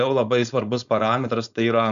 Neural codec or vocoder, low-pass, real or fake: codec, 16 kHz, 4.8 kbps, FACodec; 7.2 kHz; fake